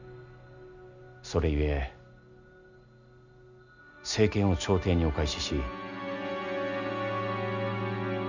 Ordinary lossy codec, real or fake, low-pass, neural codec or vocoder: Opus, 64 kbps; real; 7.2 kHz; none